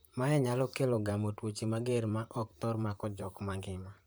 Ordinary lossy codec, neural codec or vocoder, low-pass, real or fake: none; vocoder, 44.1 kHz, 128 mel bands, Pupu-Vocoder; none; fake